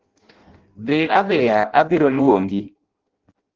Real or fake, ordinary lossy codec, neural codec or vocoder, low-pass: fake; Opus, 16 kbps; codec, 16 kHz in and 24 kHz out, 0.6 kbps, FireRedTTS-2 codec; 7.2 kHz